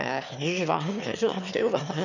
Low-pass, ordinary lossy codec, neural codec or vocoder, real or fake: 7.2 kHz; none; autoencoder, 22.05 kHz, a latent of 192 numbers a frame, VITS, trained on one speaker; fake